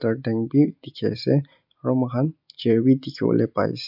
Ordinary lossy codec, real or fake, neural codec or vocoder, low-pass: none; real; none; 5.4 kHz